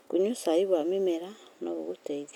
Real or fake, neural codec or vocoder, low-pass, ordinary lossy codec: real; none; 19.8 kHz; none